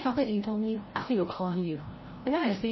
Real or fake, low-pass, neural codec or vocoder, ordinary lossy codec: fake; 7.2 kHz; codec, 16 kHz, 0.5 kbps, FreqCodec, larger model; MP3, 24 kbps